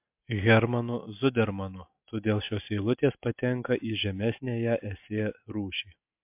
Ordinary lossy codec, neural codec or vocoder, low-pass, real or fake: MP3, 32 kbps; none; 3.6 kHz; real